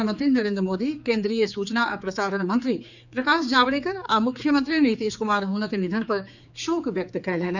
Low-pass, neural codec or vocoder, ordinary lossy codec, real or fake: 7.2 kHz; codec, 16 kHz, 4 kbps, X-Codec, HuBERT features, trained on general audio; none; fake